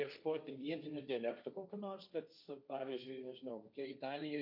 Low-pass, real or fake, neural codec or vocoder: 5.4 kHz; fake; codec, 16 kHz, 1.1 kbps, Voila-Tokenizer